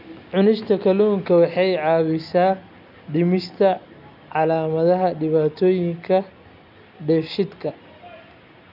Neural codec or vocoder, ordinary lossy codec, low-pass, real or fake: none; none; 5.4 kHz; real